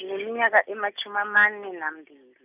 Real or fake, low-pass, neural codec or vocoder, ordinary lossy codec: real; 3.6 kHz; none; none